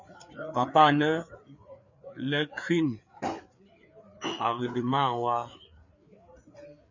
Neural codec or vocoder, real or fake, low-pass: codec, 16 kHz, 4 kbps, FreqCodec, larger model; fake; 7.2 kHz